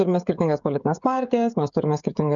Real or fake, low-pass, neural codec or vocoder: fake; 7.2 kHz; codec, 16 kHz, 16 kbps, FreqCodec, smaller model